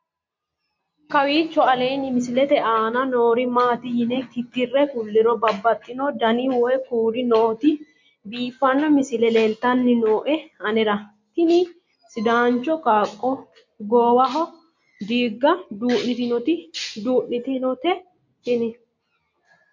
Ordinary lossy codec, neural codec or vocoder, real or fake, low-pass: MP3, 48 kbps; none; real; 7.2 kHz